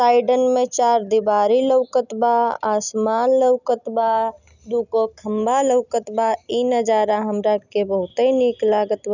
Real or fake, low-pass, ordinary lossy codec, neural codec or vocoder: real; 7.2 kHz; none; none